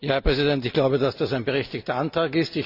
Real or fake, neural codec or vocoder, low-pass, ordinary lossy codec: real; none; 5.4 kHz; Opus, 64 kbps